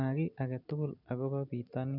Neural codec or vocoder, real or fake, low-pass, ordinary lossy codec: vocoder, 44.1 kHz, 128 mel bands every 256 samples, BigVGAN v2; fake; 5.4 kHz; none